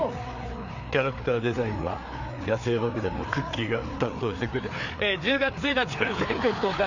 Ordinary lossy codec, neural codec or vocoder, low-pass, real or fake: none; codec, 16 kHz, 4 kbps, FreqCodec, larger model; 7.2 kHz; fake